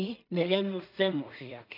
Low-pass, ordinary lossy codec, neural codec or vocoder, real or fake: 5.4 kHz; none; codec, 16 kHz in and 24 kHz out, 0.4 kbps, LongCat-Audio-Codec, two codebook decoder; fake